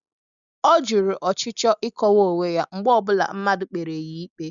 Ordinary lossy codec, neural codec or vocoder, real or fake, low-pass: none; none; real; 7.2 kHz